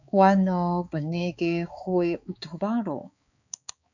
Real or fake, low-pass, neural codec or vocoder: fake; 7.2 kHz; codec, 16 kHz, 4 kbps, X-Codec, HuBERT features, trained on balanced general audio